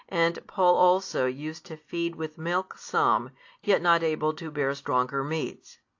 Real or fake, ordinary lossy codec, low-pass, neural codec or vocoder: real; AAC, 48 kbps; 7.2 kHz; none